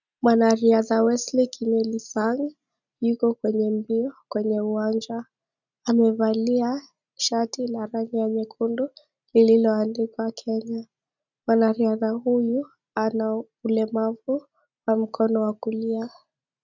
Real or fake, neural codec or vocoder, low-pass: real; none; 7.2 kHz